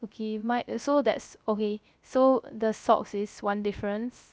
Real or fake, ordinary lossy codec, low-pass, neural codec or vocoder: fake; none; none; codec, 16 kHz, 0.3 kbps, FocalCodec